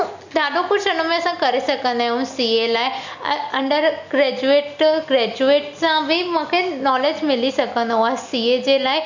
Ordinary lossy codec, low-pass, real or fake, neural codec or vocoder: none; 7.2 kHz; real; none